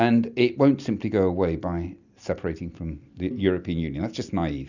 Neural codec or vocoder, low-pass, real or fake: none; 7.2 kHz; real